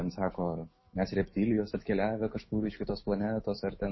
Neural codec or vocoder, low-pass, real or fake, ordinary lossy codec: autoencoder, 48 kHz, 128 numbers a frame, DAC-VAE, trained on Japanese speech; 7.2 kHz; fake; MP3, 24 kbps